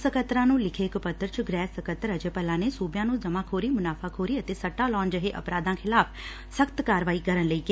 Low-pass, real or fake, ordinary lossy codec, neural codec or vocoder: none; real; none; none